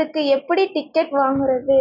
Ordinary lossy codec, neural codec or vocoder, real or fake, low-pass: none; none; real; 5.4 kHz